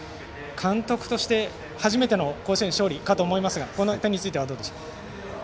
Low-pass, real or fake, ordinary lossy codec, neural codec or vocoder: none; real; none; none